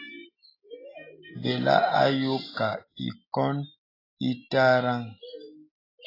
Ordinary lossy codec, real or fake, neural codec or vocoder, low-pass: AAC, 24 kbps; real; none; 5.4 kHz